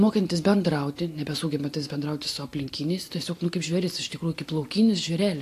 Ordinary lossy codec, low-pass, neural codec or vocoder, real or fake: AAC, 64 kbps; 14.4 kHz; none; real